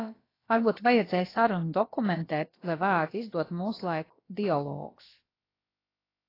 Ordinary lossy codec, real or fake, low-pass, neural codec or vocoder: AAC, 24 kbps; fake; 5.4 kHz; codec, 16 kHz, about 1 kbps, DyCAST, with the encoder's durations